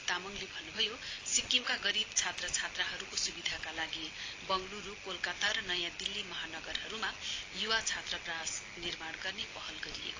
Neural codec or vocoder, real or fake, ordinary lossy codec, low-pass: none; real; AAC, 32 kbps; 7.2 kHz